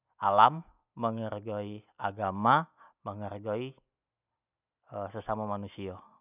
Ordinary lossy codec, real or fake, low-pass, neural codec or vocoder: none; real; 3.6 kHz; none